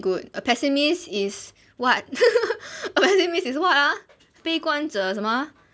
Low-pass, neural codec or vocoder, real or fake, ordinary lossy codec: none; none; real; none